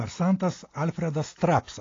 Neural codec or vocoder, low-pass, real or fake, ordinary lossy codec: none; 7.2 kHz; real; AAC, 32 kbps